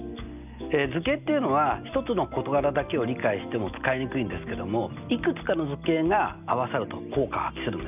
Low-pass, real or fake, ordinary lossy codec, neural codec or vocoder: 3.6 kHz; real; none; none